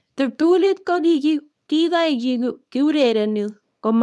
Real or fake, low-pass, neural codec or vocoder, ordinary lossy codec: fake; none; codec, 24 kHz, 0.9 kbps, WavTokenizer, small release; none